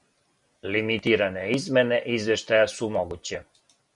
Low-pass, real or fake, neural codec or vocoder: 10.8 kHz; real; none